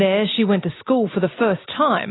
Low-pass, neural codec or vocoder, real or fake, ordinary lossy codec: 7.2 kHz; none; real; AAC, 16 kbps